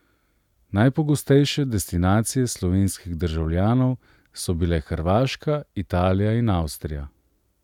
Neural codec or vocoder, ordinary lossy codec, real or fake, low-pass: none; none; real; 19.8 kHz